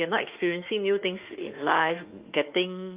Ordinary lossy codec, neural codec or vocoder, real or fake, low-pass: Opus, 24 kbps; autoencoder, 48 kHz, 32 numbers a frame, DAC-VAE, trained on Japanese speech; fake; 3.6 kHz